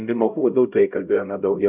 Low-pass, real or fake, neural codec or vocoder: 3.6 kHz; fake; codec, 16 kHz, 0.5 kbps, X-Codec, HuBERT features, trained on LibriSpeech